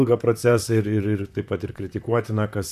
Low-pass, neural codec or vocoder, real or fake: 14.4 kHz; vocoder, 44.1 kHz, 128 mel bands, Pupu-Vocoder; fake